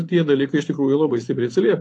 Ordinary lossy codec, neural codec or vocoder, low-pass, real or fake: AAC, 48 kbps; vocoder, 24 kHz, 100 mel bands, Vocos; 10.8 kHz; fake